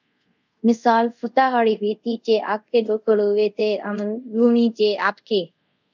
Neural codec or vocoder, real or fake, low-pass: codec, 24 kHz, 0.5 kbps, DualCodec; fake; 7.2 kHz